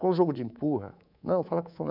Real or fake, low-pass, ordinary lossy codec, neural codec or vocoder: fake; 5.4 kHz; none; codec, 24 kHz, 3.1 kbps, DualCodec